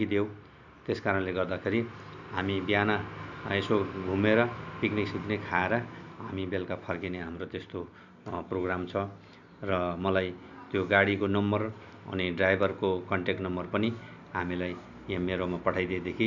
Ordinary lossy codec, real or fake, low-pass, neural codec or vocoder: none; real; 7.2 kHz; none